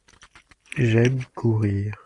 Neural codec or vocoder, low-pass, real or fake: none; 10.8 kHz; real